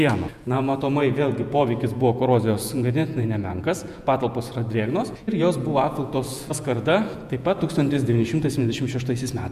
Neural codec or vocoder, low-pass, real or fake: vocoder, 48 kHz, 128 mel bands, Vocos; 14.4 kHz; fake